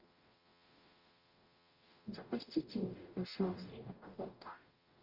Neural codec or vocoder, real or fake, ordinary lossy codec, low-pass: codec, 44.1 kHz, 0.9 kbps, DAC; fake; Opus, 32 kbps; 5.4 kHz